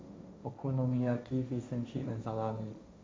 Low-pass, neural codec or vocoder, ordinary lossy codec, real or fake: none; codec, 16 kHz, 1.1 kbps, Voila-Tokenizer; none; fake